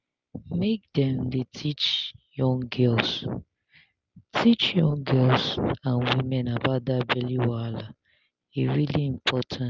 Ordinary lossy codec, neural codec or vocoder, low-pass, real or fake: Opus, 24 kbps; none; 7.2 kHz; real